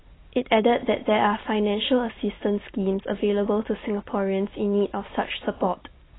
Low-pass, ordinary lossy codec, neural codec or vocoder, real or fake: 7.2 kHz; AAC, 16 kbps; none; real